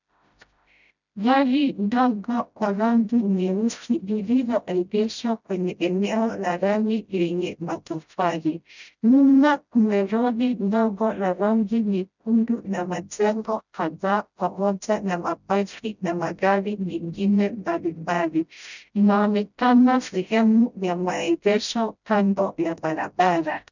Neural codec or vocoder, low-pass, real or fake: codec, 16 kHz, 0.5 kbps, FreqCodec, smaller model; 7.2 kHz; fake